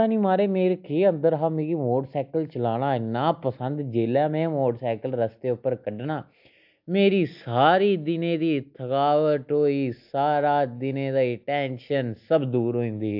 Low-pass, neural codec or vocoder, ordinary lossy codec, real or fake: 5.4 kHz; none; AAC, 48 kbps; real